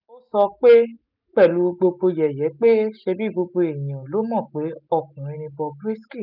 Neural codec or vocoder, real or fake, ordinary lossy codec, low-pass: none; real; none; 5.4 kHz